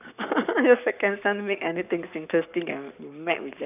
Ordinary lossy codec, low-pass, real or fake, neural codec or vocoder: none; 3.6 kHz; fake; codec, 44.1 kHz, 7.8 kbps, Pupu-Codec